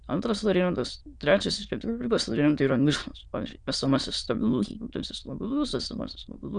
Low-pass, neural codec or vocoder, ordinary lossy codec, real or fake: 9.9 kHz; autoencoder, 22.05 kHz, a latent of 192 numbers a frame, VITS, trained on many speakers; Opus, 64 kbps; fake